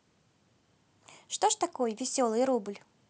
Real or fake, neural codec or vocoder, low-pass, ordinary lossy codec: real; none; none; none